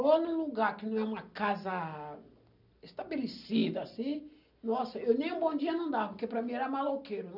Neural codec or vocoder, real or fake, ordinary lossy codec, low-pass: none; real; none; 5.4 kHz